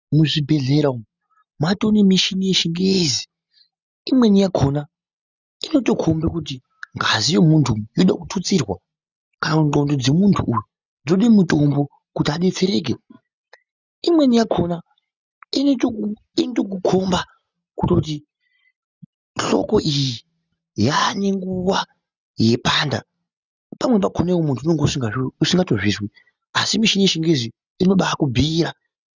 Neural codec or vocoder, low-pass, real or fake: none; 7.2 kHz; real